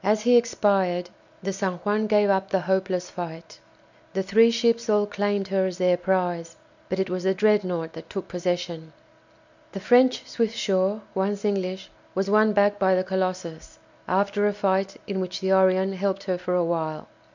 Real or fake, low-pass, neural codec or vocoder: real; 7.2 kHz; none